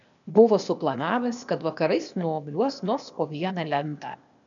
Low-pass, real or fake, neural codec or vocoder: 7.2 kHz; fake; codec, 16 kHz, 0.8 kbps, ZipCodec